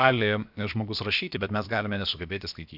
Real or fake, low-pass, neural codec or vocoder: fake; 5.4 kHz; codec, 16 kHz, about 1 kbps, DyCAST, with the encoder's durations